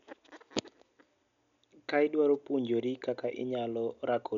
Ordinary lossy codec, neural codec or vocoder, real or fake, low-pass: none; none; real; 7.2 kHz